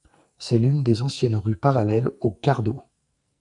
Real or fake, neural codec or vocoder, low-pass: fake; codec, 44.1 kHz, 2.6 kbps, SNAC; 10.8 kHz